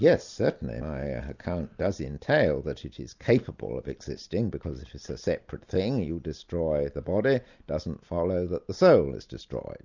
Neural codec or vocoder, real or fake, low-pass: none; real; 7.2 kHz